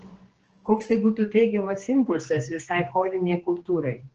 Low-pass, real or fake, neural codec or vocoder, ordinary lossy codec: 7.2 kHz; fake; codec, 16 kHz, 2 kbps, X-Codec, HuBERT features, trained on general audio; Opus, 16 kbps